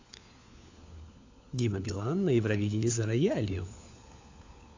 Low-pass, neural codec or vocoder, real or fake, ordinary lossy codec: 7.2 kHz; codec, 16 kHz, 4 kbps, FunCodec, trained on LibriTTS, 50 frames a second; fake; AAC, 48 kbps